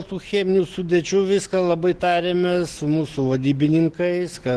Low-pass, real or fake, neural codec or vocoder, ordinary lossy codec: 10.8 kHz; fake; autoencoder, 48 kHz, 128 numbers a frame, DAC-VAE, trained on Japanese speech; Opus, 16 kbps